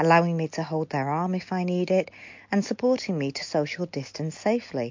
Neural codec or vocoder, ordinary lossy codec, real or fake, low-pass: none; MP3, 48 kbps; real; 7.2 kHz